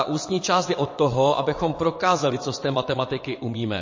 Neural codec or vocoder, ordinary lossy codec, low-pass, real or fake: vocoder, 22.05 kHz, 80 mel bands, WaveNeXt; MP3, 32 kbps; 7.2 kHz; fake